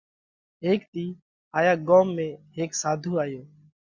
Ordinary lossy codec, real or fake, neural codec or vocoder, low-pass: Opus, 64 kbps; real; none; 7.2 kHz